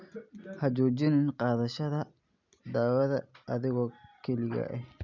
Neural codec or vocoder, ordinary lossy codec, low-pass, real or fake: none; none; 7.2 kHz; real